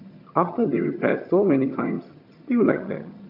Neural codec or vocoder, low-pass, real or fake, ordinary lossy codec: vocoder, 22.05 kHz, 80 mel bands, HiFi-GAN; 5.4 kHz; fake; AAC, 48 kbps